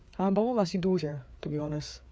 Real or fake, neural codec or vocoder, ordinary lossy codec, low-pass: fake; codec, 16 kHz, 4 kbps, FreqCodec, larger model; none; none